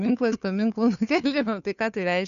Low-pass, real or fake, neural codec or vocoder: 7.2 kHz; fake; codec, 16 kHz, 2 kbps, FunCodec, trained on Chinese and English, 25 frames a second